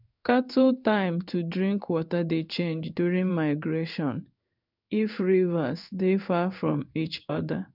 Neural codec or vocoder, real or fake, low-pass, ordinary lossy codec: codec, 16 kHz in and 24 kHz out, 1 kbps, XY-Tokenizer; fake; 5.4 kHz; none